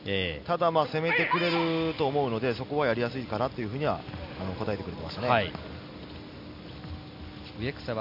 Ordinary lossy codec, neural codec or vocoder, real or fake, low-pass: none; none; real; 5.4 kHz